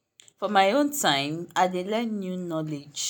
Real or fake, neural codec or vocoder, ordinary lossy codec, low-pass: real; none; none; none